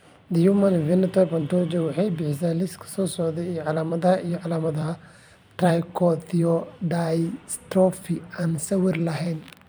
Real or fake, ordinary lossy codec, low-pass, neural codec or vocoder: fake; none; none; vocoder, 44.1 kHz, 128 mel bands every 256 samples, BigVGAN v2